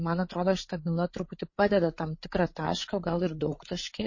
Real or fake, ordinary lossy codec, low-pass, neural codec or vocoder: fake; MP3, 32 kbps; 7.2 kHz; codec, 16 kHz, 8 kbps, FreqCodec, larger model